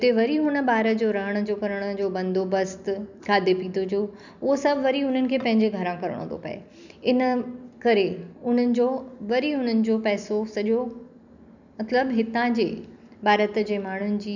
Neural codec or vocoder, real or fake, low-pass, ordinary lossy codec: none; real; 7.2 kHz; none